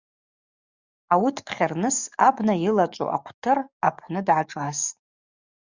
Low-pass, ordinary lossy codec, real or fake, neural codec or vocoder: 7.2 kHz; Opus, 64 kbps; fake; codec, 44.1 kHz, 7.8 kbps, DAC